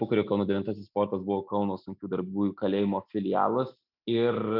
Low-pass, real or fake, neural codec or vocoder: 5.4 kHz; real; none